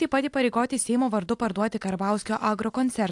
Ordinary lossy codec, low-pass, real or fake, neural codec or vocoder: AAC, 64 kbps; 10.8 kHz; real; none